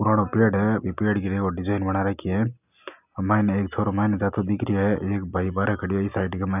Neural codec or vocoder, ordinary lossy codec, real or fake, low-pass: none; none; real; 3.6 kHz